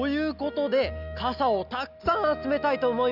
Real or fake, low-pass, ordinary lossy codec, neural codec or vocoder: real; 5.4 kHz; none; none